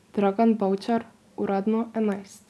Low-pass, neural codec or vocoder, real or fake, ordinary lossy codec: none; none; real; none